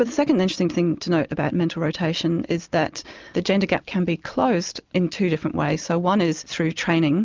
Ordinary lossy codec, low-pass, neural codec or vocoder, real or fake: Opus, 24 kbps; 7.2 kHz; none; real